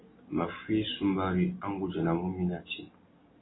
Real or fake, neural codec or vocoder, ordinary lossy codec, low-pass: real; none; AAC, 16 kbps; 7.2 kHz